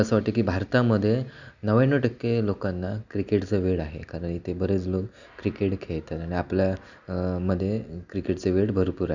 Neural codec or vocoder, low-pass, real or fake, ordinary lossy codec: none; 7.2 kHz; real; none